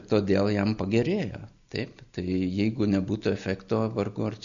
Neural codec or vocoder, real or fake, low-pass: none; real; 7.2 kHz